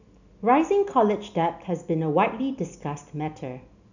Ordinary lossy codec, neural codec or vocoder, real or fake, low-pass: none; none; real; 7.2 kHz